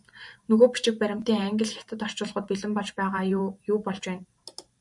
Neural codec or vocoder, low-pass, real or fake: vocoder, 44.1 kHz, 128 mel bands every 512 samples, BigVGAN v2; 10.8 kHz; fake